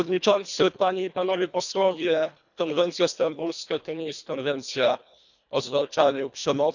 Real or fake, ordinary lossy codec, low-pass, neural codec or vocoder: fake; none; 7.2 kHz; codec, 24 kHz, 1.5 kbps, HILCodec